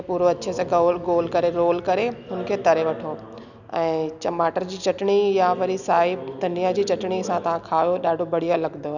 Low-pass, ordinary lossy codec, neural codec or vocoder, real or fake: 7.2 kHz; none; none; real